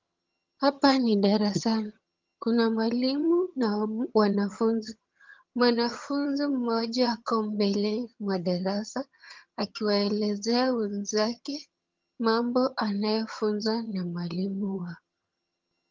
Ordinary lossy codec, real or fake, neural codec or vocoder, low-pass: Opus, 32 kbps; fake; vocoder, 22.05 kHz, 80 mel bands, HiFi-GAN; 7.2 kHz